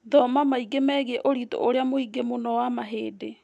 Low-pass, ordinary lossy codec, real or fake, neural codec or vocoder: none; none; real; none